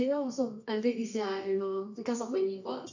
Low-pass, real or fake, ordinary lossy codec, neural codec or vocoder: 7.2 kHz; fake; none; codec, 24 kHz, 0.9 kbps, WavTokenizer, medium music audio release